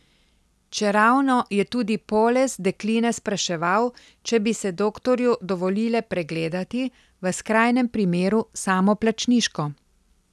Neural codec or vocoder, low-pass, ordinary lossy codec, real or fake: none; none; none; real